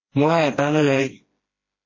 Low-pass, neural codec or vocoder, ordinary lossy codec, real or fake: 7.2 kHz; codec, 16 kHz, 1 kbps, FreqCodec, smaller model; MP3, 32 kbps; fake